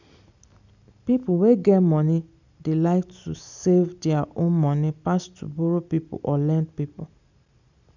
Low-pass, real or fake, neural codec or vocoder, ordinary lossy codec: 7.2 kHz; real; none; none